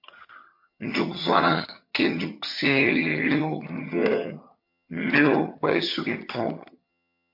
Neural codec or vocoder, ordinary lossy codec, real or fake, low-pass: vocoder, 22.05 kHz, 80 mel bands, HiFi-GAN; MP3, 32 kbps; fake; 5.4 kHz